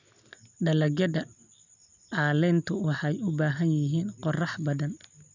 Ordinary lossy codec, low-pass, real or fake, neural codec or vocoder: none; 7.2 kHz; real; none